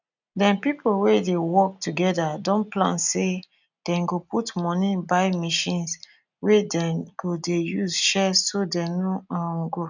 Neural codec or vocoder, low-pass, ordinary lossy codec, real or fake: none; 7.2 kHz; none; real